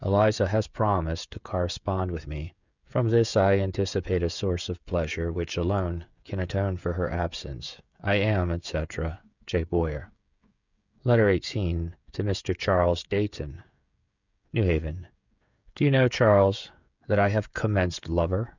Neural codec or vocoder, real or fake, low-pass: codec, 16 kHz, 16 kbps, FreqCodec, smaller model; fake; 7.2 kHz